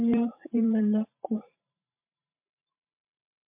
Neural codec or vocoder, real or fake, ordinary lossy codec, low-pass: vocoder, 44.1 kHz, 128 mel bands every 512 samples, BigVGAN v2; fake; MP3, 32 kbps; 3.6 kHz